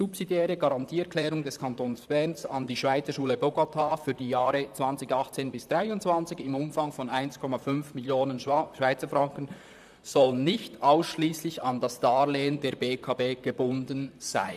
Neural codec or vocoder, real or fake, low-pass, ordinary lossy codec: vocoder, 44.1 kHz, 128 mel bands, Pupu-Vocoder; fake; 14.4 kHz; none